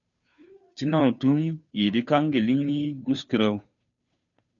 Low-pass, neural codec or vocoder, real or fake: 7.2 kHz; codec, 16 kHz, 2 kbps, FunCodec, trained on Chinese and English, 25 frames a second; fake